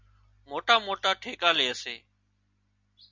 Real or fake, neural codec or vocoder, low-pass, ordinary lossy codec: real; none; 7.2 kHz; MP3, 64 kbps